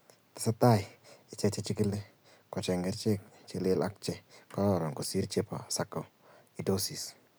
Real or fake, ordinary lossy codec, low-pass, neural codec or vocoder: real; none; none; none